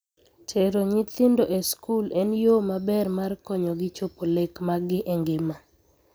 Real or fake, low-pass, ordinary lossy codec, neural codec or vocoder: real; none; none; none